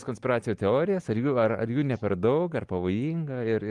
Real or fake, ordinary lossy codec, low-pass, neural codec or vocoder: real; Opus, 24 kbps; 10.8 kHz; none